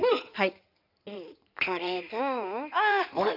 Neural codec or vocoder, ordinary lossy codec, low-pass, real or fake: codec, 16 kHz in and 24 kHz out, 2.2 kbps, FireRedTTS-2 codec; none; 5.4 kHz; fake